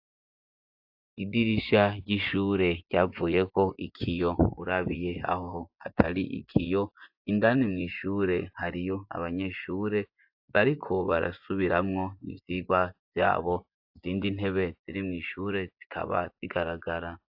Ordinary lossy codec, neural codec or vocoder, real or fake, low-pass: AAC, 48 kbps; vocoder, 24 kHz, 100 mel bands, Vocos; fake; 5.4 kHz